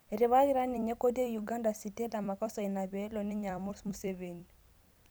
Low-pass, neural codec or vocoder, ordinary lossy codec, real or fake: none; vocoder, 44.1 kHz, 128 mel bands every 256 samples, BigVGAN v2; none; fake